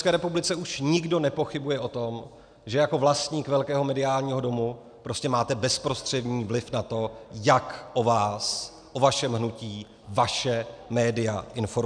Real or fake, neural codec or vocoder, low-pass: real; none; 9.9 kHz